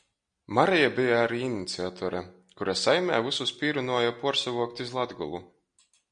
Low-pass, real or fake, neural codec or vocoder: 9.9 kHz; real; none